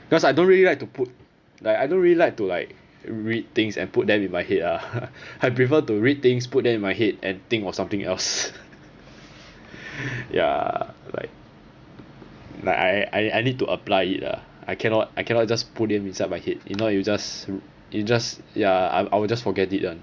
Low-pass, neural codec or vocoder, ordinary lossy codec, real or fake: 7.2 kHz; none; Opus, 64 kbps; real